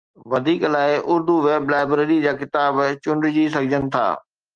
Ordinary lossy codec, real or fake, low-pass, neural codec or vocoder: Opus, 24 kbps; real; 9.9 kHz; none